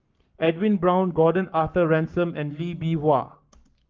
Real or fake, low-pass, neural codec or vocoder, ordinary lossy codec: fake; 7.2 kHz; codec, 44.1 kHz, 7.8 kbps, Pupu-Codec; Opus, 32 kbps